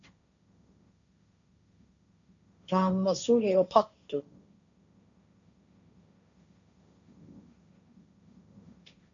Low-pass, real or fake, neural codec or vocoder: 7.2 kHz; fake; codec, 16 kHz, 1.1 kbps, Voila-Tokenizer